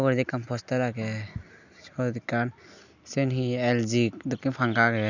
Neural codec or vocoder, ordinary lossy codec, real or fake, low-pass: none; none; real; 7.2 kHz